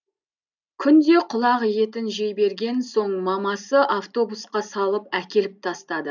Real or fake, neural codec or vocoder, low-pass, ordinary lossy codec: real; none; 7.2 kHz; none